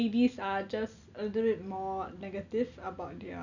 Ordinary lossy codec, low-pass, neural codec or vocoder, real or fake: none; 7.2 kHz; none; real